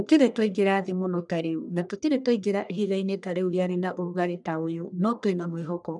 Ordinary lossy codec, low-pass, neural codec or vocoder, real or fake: none; 10.8 kHz; codec, 44.1 kHz, 1.7 kbps, Pupu-Codec; fake